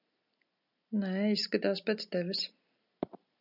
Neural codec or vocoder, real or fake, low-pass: none; real; 5.4 kHz